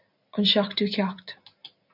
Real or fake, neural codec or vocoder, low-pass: real; none; 5.4 kHz